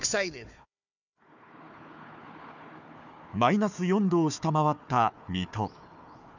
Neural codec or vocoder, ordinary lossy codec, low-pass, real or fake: codec, 16 kHz, 4 kbps, FunCodec, trained on Chinese and English, 50 frames a second; none; 7.2 kHz; fake